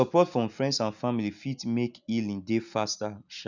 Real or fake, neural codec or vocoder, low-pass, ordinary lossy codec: real; none; 7.2 kHz; none